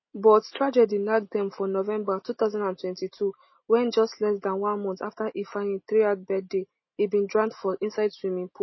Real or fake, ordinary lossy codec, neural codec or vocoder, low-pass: real; MP3, 24 kbps; none; 7.2 kHz